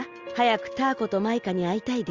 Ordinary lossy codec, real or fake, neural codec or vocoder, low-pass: Opus, 32 kbps; real; none; 7.2 kHz